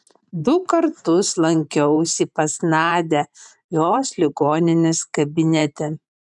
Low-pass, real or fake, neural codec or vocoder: 10.8 kHz; fake; vocoder, 44.1 kHz, 128 mel bands every 512 samples, BigVGAN v2